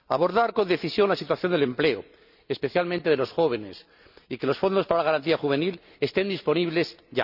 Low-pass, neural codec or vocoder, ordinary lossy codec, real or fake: 5.4 kHz; none; none; real